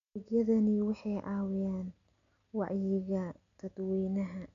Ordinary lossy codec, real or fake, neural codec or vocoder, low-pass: none; real; none; 7.2 kHz